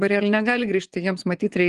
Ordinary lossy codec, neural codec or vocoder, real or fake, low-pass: Opus, 24 kbps; vocoder, 24 kHz, 100 mel bands, Vocos; fake; 10.8 kHz